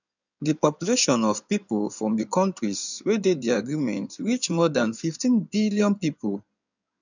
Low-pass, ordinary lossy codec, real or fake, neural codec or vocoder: 7.2 kHz; none; fake; codec, 16 kHz in and 24 kHz out, 2.2 kbps, FireRedTTS-2 codec